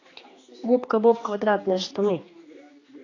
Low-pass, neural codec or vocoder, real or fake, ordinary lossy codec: 7.2 kHz; codec, 16 kHz, 2 kbps, X-Codec, HuBERT features, trained on general audio; fake; AAC, 32 kbps